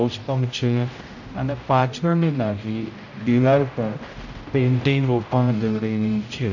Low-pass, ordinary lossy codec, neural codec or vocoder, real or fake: 7.2 kHz; none; codec, 16 kHz, 0.5 kbps, X-Codec, HuBERT features, trained on general audio; fake